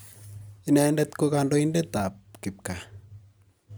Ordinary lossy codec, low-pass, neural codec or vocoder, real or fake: none; none; none; real